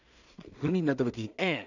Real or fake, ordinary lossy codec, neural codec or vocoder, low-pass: fake; none; codec, 16 kHz in and 24 kHz out, 0.4 kbps, LongCat-Audio-Codec, two codebook decoder; 7.2 kHz